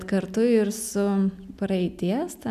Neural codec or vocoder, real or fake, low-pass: none; real; 14.4 kHz